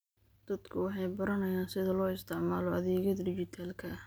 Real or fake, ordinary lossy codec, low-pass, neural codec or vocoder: real; none; none; none